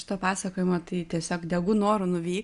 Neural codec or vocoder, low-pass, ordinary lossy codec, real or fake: none; 10.8 kHz; Opus, 64 kbps; real